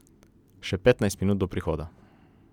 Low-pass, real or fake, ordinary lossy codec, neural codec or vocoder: 19.8 kHz; real; none; none